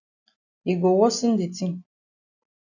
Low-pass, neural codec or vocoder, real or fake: 7.2 kHz; none; real